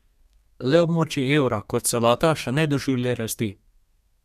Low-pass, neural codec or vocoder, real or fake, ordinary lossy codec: 14.4 kHz; codec, 32 kHz, 1.9 kbps, SNAC; fake; none